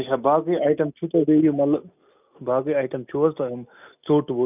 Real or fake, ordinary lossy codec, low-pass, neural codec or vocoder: real; none; 3.6 kHz; none